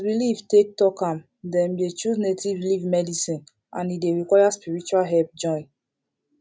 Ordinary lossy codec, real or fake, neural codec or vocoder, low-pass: none; real; none; none